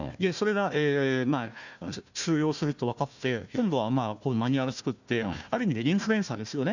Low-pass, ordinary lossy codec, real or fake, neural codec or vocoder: 7.2 kHz; none; fake; codec, 16 kHz, 1 kbps, FunCodec, trained on Chinese and English, 50 frames a second